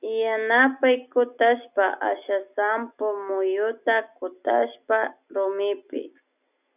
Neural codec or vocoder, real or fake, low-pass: none; real; 3.6 kHz